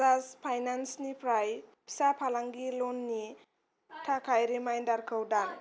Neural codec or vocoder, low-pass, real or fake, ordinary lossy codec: none; none; real; none